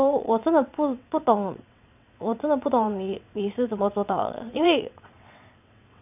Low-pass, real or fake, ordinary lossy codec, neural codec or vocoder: 3.6 kHz; fake; none; vocoder, 22.05 kHz, 80 mel bands, WaveNeXt